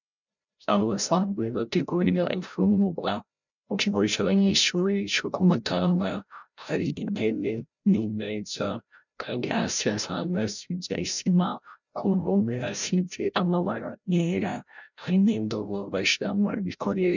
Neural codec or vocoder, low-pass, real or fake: codec, 16 kHz, 0.5 kbps, FreqCodec, larger model; 7.2 kHz; fake